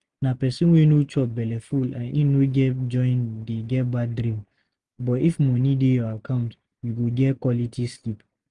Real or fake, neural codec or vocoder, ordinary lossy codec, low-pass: real; none; Opus, 32 kbps; 10.8 kHz